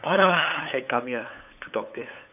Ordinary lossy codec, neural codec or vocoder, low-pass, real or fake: none; codec, 16 kHz, 8 kbps, FunCodec, trained on LibriTTS, 25 frames a second; 3.6 kHz; fake